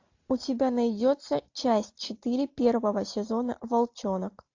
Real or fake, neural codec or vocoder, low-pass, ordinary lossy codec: real; none; 7.2 kHz; AAC, 48 kbps